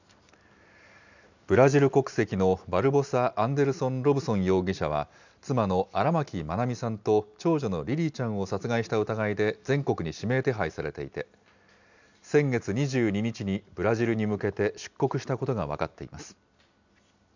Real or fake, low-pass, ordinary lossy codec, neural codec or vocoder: real; 7.2 kHz; none; none